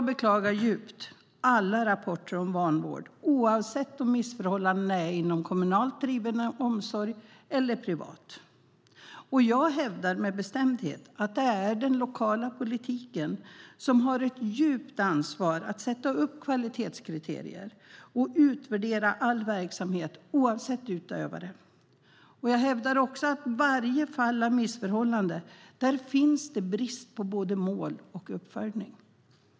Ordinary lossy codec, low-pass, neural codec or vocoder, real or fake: none; none; none; real